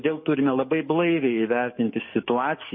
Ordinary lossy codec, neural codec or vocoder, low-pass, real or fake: MP3, 24 kbps; codec, 44.1 kHz, 7.8 kbps, DAC; 7.2 kHz; fake